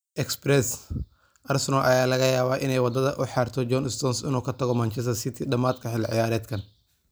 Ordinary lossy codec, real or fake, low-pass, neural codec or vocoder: none; real; none; none